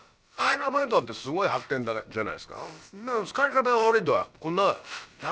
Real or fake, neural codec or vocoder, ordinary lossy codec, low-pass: fake; codec, 16 kHz, about 1 kbps, DyCAST, with the encoder's durations; none; none